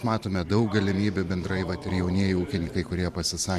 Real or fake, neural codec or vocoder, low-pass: fake; vocoder, 44.1 kHz, 128 mel bands every 512 samples, BigVGAN v2; 14.4 kHz